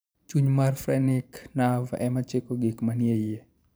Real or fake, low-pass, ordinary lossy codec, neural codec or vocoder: fake; none; none; vocoder, 44.1 kHz, 128 mel bands every 512 samples, BigVGAN v2